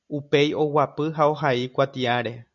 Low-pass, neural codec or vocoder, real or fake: 7.2 kHz; none; real